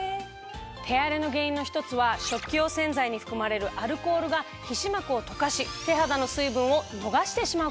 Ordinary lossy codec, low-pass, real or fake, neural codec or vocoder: none; none; real; none